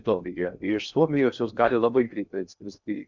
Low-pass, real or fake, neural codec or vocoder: 7.2 kHz; fake; codec, 16 kHz in and 24 kHz out, 0.6 kbps, FocalCodec, streaming, 4096 codes